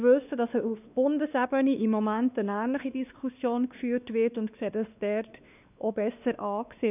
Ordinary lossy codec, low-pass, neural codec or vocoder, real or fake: none; 3.6 kHz; codec, 16 kHz, 2 kbps, X-Codec, WavLM features, trained on Multilingual LibriSpeech; fake